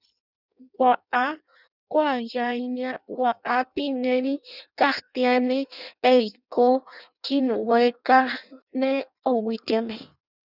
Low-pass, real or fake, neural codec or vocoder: 5.4 kHz; fake; codec, 16 kHz in and 24 kHz out, 0.6 kbps, FireRedTTS-2 codec